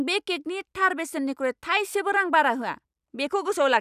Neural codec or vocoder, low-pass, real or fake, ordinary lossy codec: none; 14.4 kHz; real; none